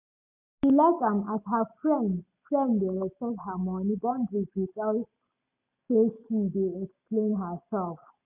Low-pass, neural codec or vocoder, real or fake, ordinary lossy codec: 3.6 kHz; none; real; none